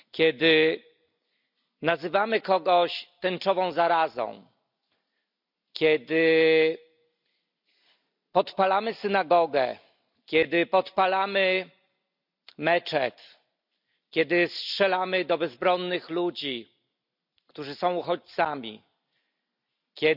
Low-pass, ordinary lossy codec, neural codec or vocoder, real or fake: 5.4 kHz; none; none; real